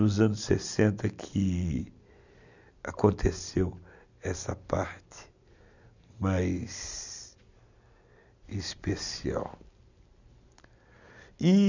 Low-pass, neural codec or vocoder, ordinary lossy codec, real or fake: 7.2 kHz; none; none; real